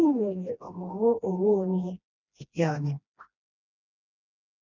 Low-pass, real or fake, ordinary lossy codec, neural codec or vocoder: 7.2 kHz; fake; none; codec, 16 kHz, 1 kbps, FreqCodec, smaller model